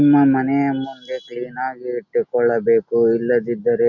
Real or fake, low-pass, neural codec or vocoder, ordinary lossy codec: real; 7.2 kHz; none; none